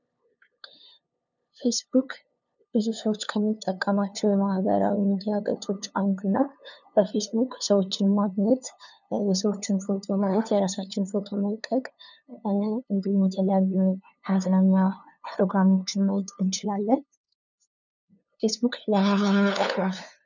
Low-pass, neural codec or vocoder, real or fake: 7.2 kHz; codec, 16 kHz, 2 kbps, FunCodec, trained on LibriTTS, 25 frames a second; fake